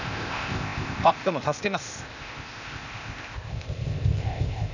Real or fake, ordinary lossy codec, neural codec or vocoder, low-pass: fake; none; codec, 16 kHz, 0.8 kbps, ZipCodec; 7.2 kHz